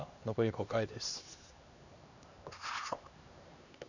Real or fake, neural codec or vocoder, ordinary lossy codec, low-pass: fake; codec, 16 kHz, 2 kbps, X-Codec, HuBERT features, trained on LibriSpeech; none; 7.2 kHz